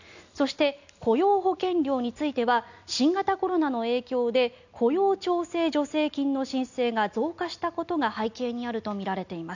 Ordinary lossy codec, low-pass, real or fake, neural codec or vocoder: none; 7.2 kHz; real; none